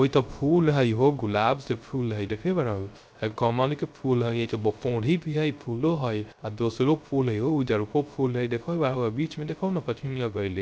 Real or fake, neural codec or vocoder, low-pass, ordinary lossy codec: fake; codec, 16 kHz, 0.3 kbps, FocalCodec; none; none